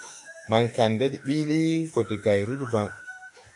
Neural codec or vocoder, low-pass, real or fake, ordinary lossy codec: autoencoder, 48 kHz, 32 numbers a frame, DAC-VAE, trained on Japanese speech; 10.8 kHz; fake; AAC, 48 kbps